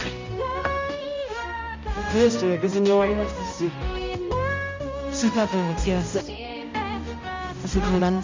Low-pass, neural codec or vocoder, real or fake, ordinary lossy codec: 7.2 kHz; codec, 16 kHz, 0.5 kbps, X-Codec, HuBERT features, trained on balanced general audio; fake; MP3, 64 kbps